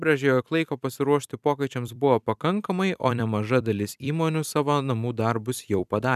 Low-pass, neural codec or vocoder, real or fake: 14.4 kHz; vocoder, 44.1 kHz, 128 mel bands every 256 samples, BigVGAN v2; fake